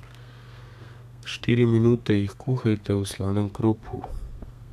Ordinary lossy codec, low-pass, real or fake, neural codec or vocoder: none; 14.4 kHz; fake; codec, 32 kHz, 1.9 kbps, SNAC